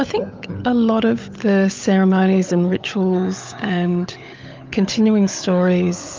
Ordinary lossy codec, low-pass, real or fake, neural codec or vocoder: Opus, 24 kbps; 7.2 kHz; fake; codec, 16 kHz, 16 kbps, FunCodec, trained on LibriTTS, 50 frames a second